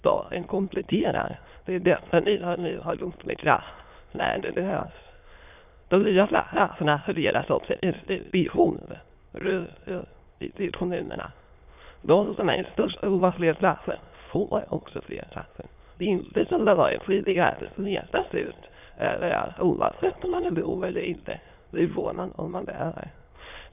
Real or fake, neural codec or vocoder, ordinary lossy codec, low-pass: fake; autoencoder, 22.05 kHz, a latent of 192 numbers a frame, VITS, trained on many speakers; none; 3.6 kHz